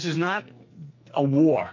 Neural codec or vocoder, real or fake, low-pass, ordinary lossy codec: codec, 44.1 kHz, 2.6 kbps, SNAC; fake; 7.2 kHz; MP3, 48 kbps